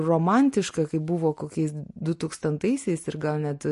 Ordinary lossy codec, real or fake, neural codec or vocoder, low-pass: MP3, 48 kbps; real; none; 14.4 kHz